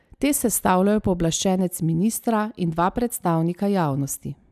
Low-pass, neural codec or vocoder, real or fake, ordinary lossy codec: 14.4 kHz; none; real; none